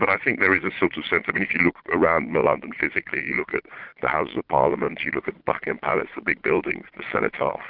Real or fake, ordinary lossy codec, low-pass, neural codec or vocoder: fake; Opus, 32 kbps; 5.4 kHz; vocoder, 22.05 kHz, 80 mel bands, Vocos